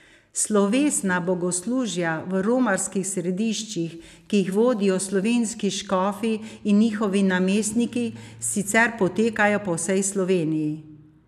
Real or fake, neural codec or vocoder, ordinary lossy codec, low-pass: real; none; none; 14.4 kHz